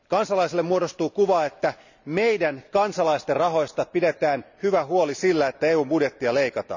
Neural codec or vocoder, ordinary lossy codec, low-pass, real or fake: none; none; 7.2 kHz; real